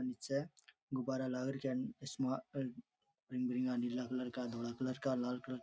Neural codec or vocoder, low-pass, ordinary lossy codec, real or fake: none; none; none; real